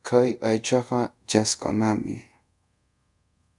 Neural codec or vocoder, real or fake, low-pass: codec, 24 kHz, 0.5 kbps, DualCodec; fake; 10.8 kHz